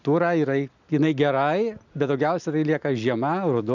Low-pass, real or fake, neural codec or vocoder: 7.2 kHz; real; none